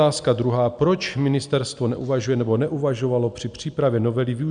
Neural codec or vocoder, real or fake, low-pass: none; real; 9.9 kHz